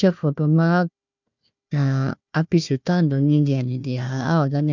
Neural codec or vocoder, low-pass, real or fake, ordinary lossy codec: codec, 16 kHz, 1 kbps, FunCodec, trained on Chinese and English, 50 frames a second; 7.2 kHz; fake; none